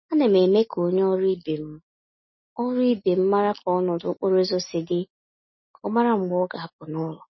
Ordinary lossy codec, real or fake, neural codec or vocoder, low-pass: MP3, 24 kbps; real; none; 7.2 kHz